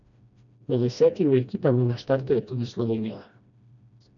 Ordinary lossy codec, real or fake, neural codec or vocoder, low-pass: none; fake; codec, 16 kHz, 1 kbps, FreqCodec, smaller model; 7.2 kHz